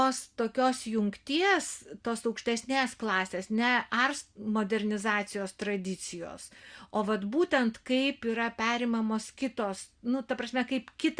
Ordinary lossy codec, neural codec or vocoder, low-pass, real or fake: Opus, 64 kbps; none; 9.9 kHz; real